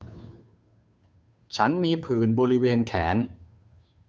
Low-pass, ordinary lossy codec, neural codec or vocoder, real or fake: none; none; codec, 16 kHz, 2 kbps, FunCodec, trained on Chinese and English, 25 frames a second; fake